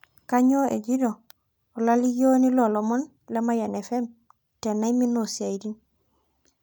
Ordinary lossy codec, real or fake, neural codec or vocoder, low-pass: none; real; none; none